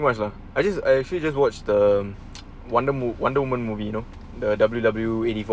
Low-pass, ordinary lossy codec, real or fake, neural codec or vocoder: none; none; real; none